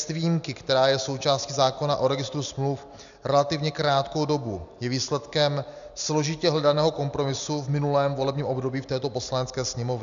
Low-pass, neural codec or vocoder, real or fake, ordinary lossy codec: 7.2 kHz; none; real; MP3, 96 kbps